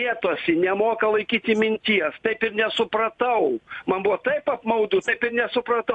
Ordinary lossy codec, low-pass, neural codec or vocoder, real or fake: AAC, 64 kbps; 10.8 kHz; none; real